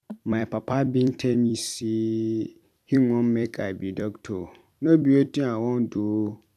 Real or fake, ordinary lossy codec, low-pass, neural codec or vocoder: fake; none; 14.4 kHz; vocoder, 44.1 kHz, 128 mel bands every 256 samples, BigVGAN v2